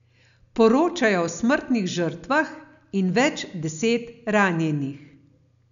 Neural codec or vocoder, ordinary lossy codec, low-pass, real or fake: none; none; 7.2 kHz; real